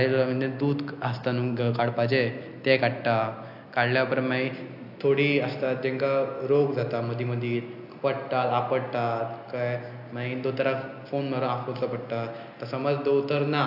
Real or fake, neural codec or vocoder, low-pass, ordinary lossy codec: real; none; 5.4 kHz; AAC, 48 kbps